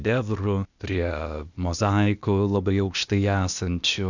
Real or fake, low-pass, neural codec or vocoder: fake; 7.2 kHz; codec, 16 kHz, 0.8 kbps, ZipCodec